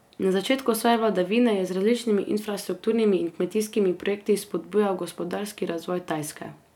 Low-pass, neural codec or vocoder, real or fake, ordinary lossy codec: 19.8 kHz; none; real; none